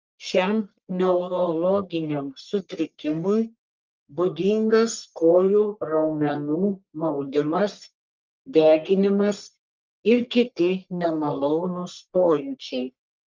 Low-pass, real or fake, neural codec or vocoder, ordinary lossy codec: 7.2 kHz; fake; codec, 44.1 kHz, 1.7 kbps, Pupu-Codec; Opus, 24 kbps